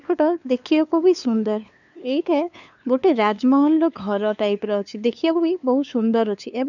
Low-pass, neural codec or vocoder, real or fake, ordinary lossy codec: 7.2 kHz; codec, 16 kHz, 2 kbps, FunCodec, trained on LibriTTS, 25 frames a second; fake; none